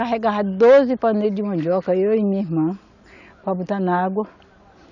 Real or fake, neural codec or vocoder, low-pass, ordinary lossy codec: real; none; 7.2 kHz; none